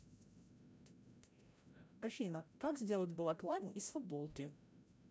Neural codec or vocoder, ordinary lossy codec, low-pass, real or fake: codec, 16 kHz, 0.5 kbps, FreqCodec, larger model; none; none; fake